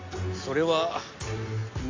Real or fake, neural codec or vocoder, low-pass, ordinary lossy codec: real; none; 7.2 kHz; AAC, 32 kbps